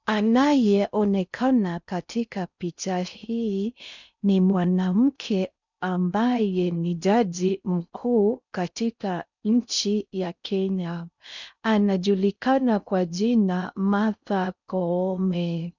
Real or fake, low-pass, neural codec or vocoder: fake; 7.2 kHz; codec, 16 kHz in and 24 kHz out, 0.6 kbps, FocalCodec, streaming, 4096 codes